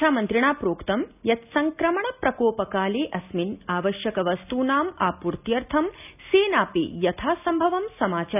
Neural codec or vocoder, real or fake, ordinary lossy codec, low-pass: none; real; none; 3.6 kHz